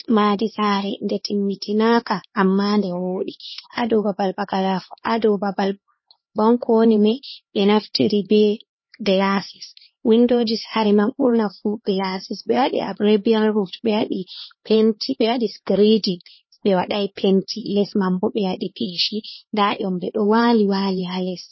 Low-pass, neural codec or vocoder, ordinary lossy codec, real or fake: 7.2 kHz; codec, 16 kHz, 2 kbps, X-Codec, HuBERT features, trained on LibriSpeech; MP3, 24 kbps; fake